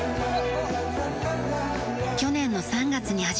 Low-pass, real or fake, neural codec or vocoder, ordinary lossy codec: none; real; none; none